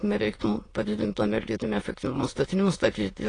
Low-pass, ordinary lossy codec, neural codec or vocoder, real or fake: 9.9 kHz; AAC, 32 kbps; autoencoder, 22.05 kHz, a latent of 192 numbers a frame, VITS, trained on many speakers; fake